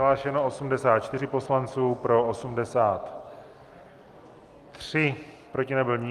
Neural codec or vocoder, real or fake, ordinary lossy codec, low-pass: none; real; Opus, 32 kbps; 14.4 kHz